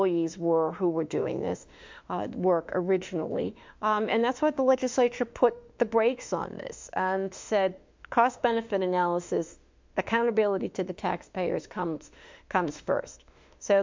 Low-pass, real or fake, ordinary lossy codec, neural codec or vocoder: 7.2 kHz; fake; MP3, 64 kbps; autoencoder, 48 kHz, 32 numbers a frame, DAC-VAE, trained on Japanese speech